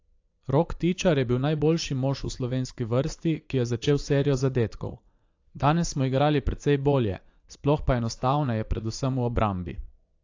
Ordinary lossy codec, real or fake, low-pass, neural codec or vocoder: AAC, 48 kbps; fake; 7.2 kHz; vocoder, 44.1 kHz, 80 mel bands, Vocos